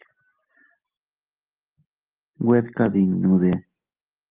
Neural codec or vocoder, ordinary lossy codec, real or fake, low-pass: none; Opus, 32 kbps; real; 3.6 kHz